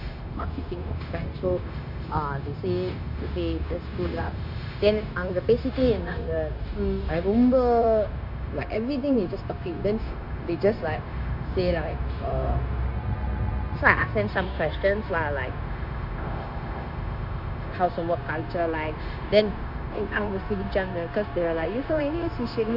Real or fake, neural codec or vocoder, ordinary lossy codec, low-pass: fake; codec, 16 kHz, 0.9 kbps, LongCat-Audio-Codec; none; 5.4 kHz